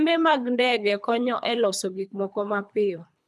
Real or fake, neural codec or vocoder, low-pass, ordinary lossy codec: fake; codec, 24 kHz, 3 kbps, HILCodec; none; none